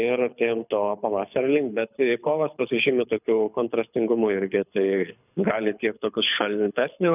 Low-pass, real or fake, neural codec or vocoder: 3.6 kHz; fake; codec, 24 kHz, 6 kbps, HILCodec